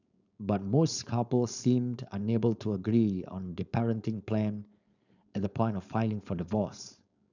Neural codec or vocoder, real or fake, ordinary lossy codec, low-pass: codec, 16 kHz, 4.8 kbps, FACodec; fake; none; 7.2 kHz